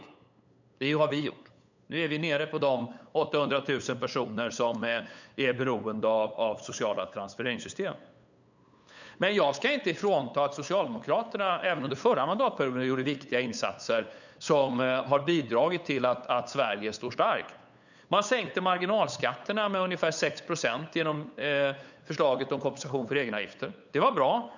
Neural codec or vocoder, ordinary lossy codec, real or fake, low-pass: codec, 16 kHz, 8 kbps, FunCodec, trained on LibriTTS, 25 frames a second; none; fake; 7.2 kHz